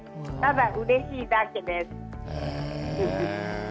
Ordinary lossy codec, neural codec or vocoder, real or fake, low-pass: none; none; real; none